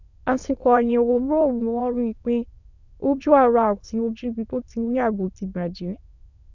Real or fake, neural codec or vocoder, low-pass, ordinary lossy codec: fake; autoencoder, 22.05 kHz, a latent of 192 numbers a frame, VITS, trained on many speakers; 7.2 kHz; none